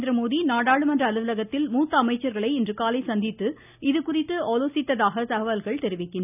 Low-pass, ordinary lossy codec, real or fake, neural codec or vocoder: 3.6 kHz; none; real; none